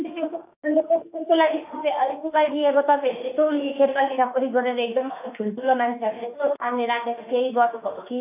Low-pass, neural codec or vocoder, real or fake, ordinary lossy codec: 3.6 kHz; autoencoder, 48 kHz, 32 numbers a frame, DAC-VAE, trained on Japanese speech; fake; AAC, 32 kbps